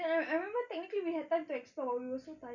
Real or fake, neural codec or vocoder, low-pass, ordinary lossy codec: real; none; 7.2 kHz; none